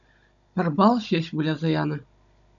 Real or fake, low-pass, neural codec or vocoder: fake; 7.2 kHz; codec, 16 kHz, 16 kbps, FunCodec, trained on Chinese and English, 50 frames a second